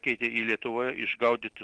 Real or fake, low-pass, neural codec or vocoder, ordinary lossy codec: real; 9.9 kHz; none; Opus, 16 kbps